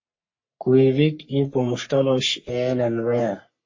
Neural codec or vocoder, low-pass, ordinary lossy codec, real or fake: codec, 44.1 kHz, 3.4 kbps, Pupu-Codec; 7.2 kHz; MP3, 32 kbps; fake